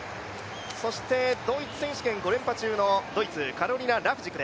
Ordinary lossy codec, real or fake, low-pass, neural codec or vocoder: none; real; none; none